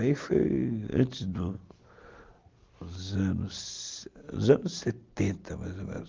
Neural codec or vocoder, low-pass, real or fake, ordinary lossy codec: none; 7.2 kHz; real; Opus, 32 kbps